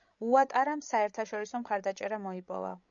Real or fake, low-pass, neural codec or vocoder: fake; 7.2 kHz; codec, 16 kHz, 16 kbps, FreqCodec, larger model